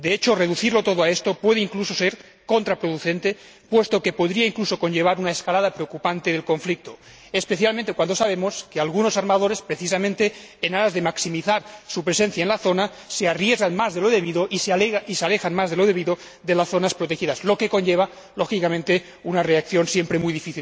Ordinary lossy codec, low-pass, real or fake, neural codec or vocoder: none; none; real; none